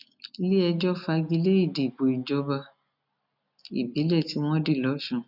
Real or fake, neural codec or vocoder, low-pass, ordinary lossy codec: real; none; 5.4 kHz; none